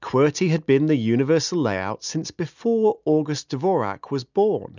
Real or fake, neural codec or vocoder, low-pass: real; none; 7.2 kHz